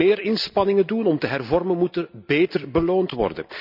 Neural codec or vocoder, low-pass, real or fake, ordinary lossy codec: none; 5.4 kHz; real; none